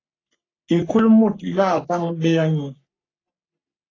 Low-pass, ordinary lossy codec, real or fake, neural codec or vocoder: 7.2 kHz; AAC, 32 kbps; fake; codec, 44.1 kHz, 3.4 kbps, Pupu-Codec